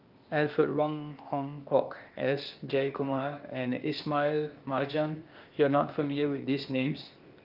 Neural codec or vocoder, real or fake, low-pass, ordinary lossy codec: codec, 16 kHz, 0.8 kbps, ZipCodec; fake; 5.4 kHz; Opus, 24 kbps